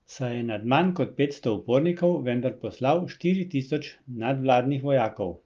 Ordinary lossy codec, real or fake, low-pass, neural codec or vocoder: Opus, 32 kbps; real; 7.2 kHz; none